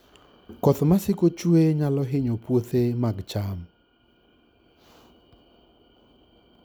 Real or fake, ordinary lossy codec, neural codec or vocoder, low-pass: real; none; none; none